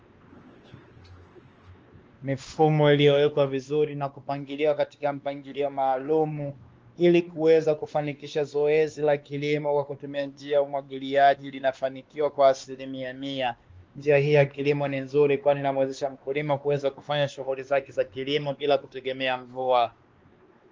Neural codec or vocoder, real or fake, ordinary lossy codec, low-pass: codec, 16 kHz, 2 kbps, X-Codec, WavLM features, trained on Multilingual LibriSpeech; fake; Opus, 24 kbps; 7.2 kHz